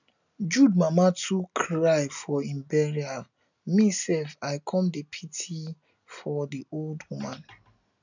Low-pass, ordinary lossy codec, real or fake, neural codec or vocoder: 7.2 kHz; none; real; none